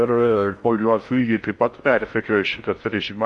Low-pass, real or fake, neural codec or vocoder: 10.8 kHz; fake; codec, 16 kHz in and 24 kHz out, 0.6 kbps, FocalCodec, streaming, 4096 codes